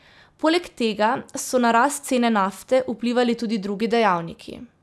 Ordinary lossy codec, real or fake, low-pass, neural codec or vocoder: none; real; none; none